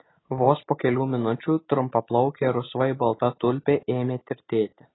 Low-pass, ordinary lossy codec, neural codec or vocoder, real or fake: 7.2 kHz; AAC, 16 kbps; none; real